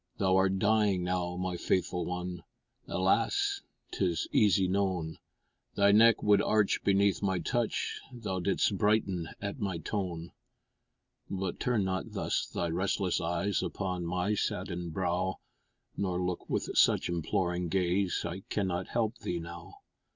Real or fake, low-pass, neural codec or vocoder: real; 7.2 kHz; none